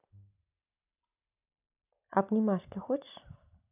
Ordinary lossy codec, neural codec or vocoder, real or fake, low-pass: none; none; real; 3.6 kHz